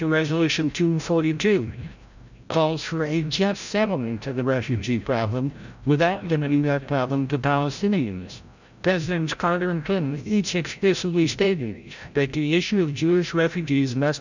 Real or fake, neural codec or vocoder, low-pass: fake; codec, 16 kHz, 0.5 kbps, FreqCodec, larger model; 7.2 kHz